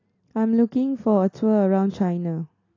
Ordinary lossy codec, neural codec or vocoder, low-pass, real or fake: AAC, 32 kbps; none; 7.2 kHz; real